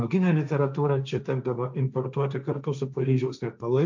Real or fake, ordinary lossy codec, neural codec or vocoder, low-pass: fake; MP3, 64 kbps; codec, 16 kHz, 1.1 kbps, Voila-Tokenizer; 7.2 kHz